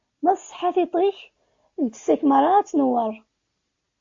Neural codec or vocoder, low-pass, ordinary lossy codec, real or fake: none; 7.2 kHz; AAC, 48 kbps; real